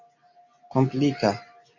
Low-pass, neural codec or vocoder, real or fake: 7.2 kHz; none; real